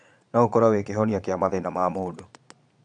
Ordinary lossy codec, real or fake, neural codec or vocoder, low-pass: MP3, 96 kbps; fake; vocoder, 22.05 kHz, 80 mel bands, Vocos; 9.9 kHz